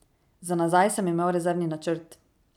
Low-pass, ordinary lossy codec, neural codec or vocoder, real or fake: 19.8 kHz; none; none; real